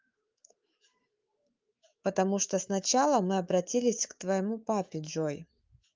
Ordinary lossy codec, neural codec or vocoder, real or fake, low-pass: Opus, 32 kbps; autoencoder, 48 kHz, 128 numbers a frame, DAC-VAE, trained on Japanese speech; fake; 7.2 kHz